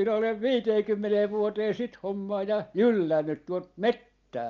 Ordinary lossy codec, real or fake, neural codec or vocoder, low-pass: Opus, 24 kbps; real; none; 7.2 kHz